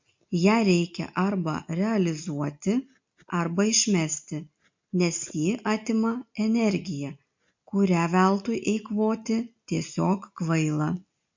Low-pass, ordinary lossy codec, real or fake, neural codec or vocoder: 7.2 kHz; MP3, 48 kbps; real; none